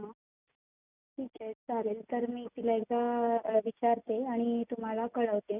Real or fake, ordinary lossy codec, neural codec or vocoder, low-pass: real; none; none; 3.6 kHz